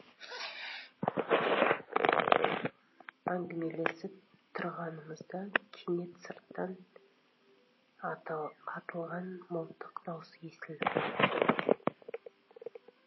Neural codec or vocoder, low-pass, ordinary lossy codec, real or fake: none; 7.2 kHz; MP3, 24 kbps; real